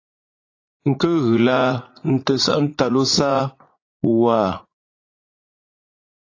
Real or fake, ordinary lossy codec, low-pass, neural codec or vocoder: fake; AAC, 32 kbps; 7.2 kHz; vocoder, 44.1 kHz, 80 mel bands, Vocos